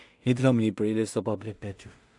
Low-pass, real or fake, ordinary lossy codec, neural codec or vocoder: 10.8 kHz; fake; AAC, 64 kbps; codec, 16 kHz in and 24 kHz out, 0.4 kbps, LongCat-Audio-Codec, two codebook decoder